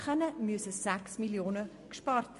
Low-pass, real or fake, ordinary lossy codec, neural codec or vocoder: 14.4 kHz; real; MP3, 48 kbps; none